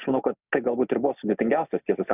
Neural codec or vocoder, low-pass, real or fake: none; 3.6 kHz; real